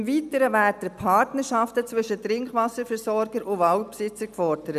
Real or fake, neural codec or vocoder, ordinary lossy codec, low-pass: real; none; none; 14.4 kHz